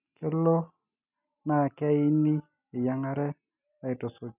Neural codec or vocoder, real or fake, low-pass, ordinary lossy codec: none; real; 3.6 kHz; none